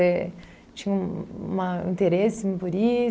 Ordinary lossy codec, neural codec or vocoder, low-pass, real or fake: none; none; none; real